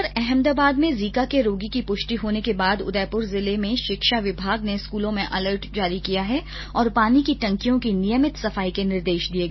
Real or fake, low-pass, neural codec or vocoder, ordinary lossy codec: real; 7.2 kHz; none; MP3, 24 kbps